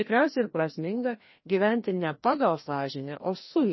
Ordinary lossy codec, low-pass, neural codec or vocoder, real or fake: MP3, 24 kbps; 7.2 kHz; codec, 16 kHz, 1 kbps, FreqCodec, larger model; fake